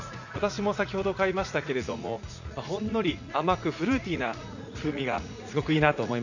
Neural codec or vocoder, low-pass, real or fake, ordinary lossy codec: vocoder, 44.1 kHz, 80 mel bands, Vocos; 7.2 kHz; fake; none